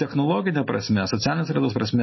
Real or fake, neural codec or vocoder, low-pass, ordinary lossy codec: real; none; 7.2 kHz; MP3, 24 kbps